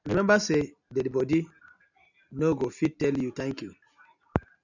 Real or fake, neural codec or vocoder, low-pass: real; none; 7.2 kHz